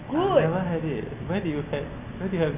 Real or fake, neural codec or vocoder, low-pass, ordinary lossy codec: real; none; 3.6 kHz; none